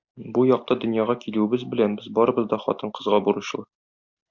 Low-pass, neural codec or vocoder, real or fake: 7.2 kHz; none; real